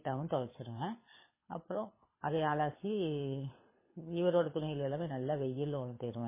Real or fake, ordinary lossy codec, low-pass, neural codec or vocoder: fake; MP3, 16 kbps; 3.6 kHz; codec, 24 kHz, 6 kbps, HILCodec